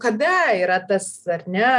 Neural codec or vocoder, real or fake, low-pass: none; real; 10.8 kHz